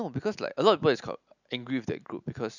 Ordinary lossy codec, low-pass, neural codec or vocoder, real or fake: none; 7.2 kHz; none; real